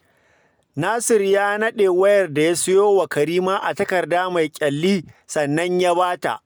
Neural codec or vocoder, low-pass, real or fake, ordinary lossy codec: none; none; real; none